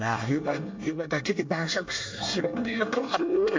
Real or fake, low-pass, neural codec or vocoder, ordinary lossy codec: fake; 7.2 kHz; codec, 24 kHz, 1 kbps, SNAC; MP3, 48 kbps